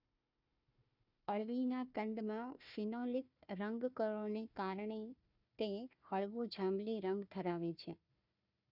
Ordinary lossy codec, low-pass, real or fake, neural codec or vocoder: none; 5.4 kHz; fake; codec, 16 kHz, 1 kbps, FunCodec, trained on Chinese and English, 50 frames a second